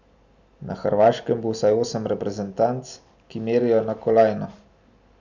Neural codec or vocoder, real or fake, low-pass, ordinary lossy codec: none; real; 7.2 kHz; none